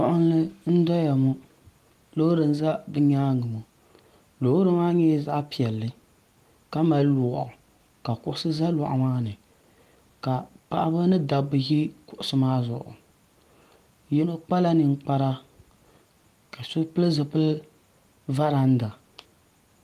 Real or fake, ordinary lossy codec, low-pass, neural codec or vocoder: real; Opus, 24 kbps; 14.4 kHz; none